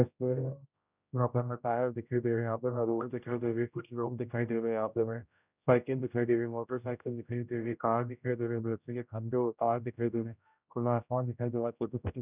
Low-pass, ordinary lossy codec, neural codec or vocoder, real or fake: 3.6 kHz; none; codec, 16 kHz, 0.5 kbps, X-Codec, HuBERT features, trained on general audio; fake